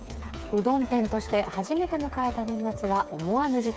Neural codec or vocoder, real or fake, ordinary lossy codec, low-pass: codec, 16 kHz, 4 kbps, FreqCodec, smaller model; fake; none; none